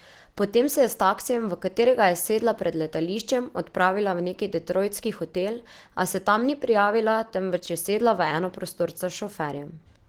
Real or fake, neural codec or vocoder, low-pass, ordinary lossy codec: real; none; 19.8 kHz; Opus, 16 kbps